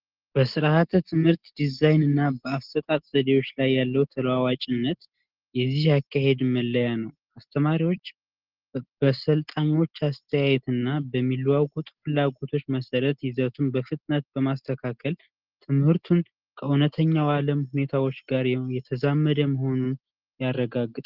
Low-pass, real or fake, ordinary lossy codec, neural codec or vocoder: 5.4 kHz; real; Opus, 16 kbps; none